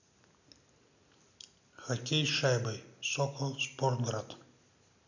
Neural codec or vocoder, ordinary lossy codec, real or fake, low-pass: none; none; real; 7.2 kHz